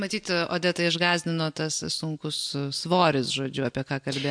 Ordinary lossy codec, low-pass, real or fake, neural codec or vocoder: MP3, 64 kbps; 9.9 kHz; real; none